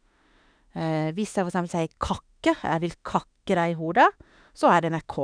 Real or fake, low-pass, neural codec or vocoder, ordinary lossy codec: fake; 9.9 kHz; autoencoder, 48 kHz, 32 numbers a frame, DAC-VAE, trained on Japanese speech; none